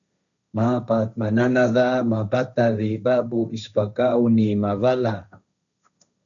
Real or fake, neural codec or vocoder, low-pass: fake; codec, 16 kHz, 1.1 kbps, Voila-Tokenizer; 7.2 kHz